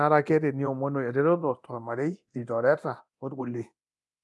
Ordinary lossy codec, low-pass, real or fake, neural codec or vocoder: none; none; fake; codec, 24 kHz, 0.9 kbps, DualCodec